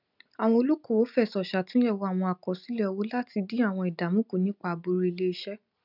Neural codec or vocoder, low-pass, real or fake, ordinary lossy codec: none; 5.4 kHz; real; none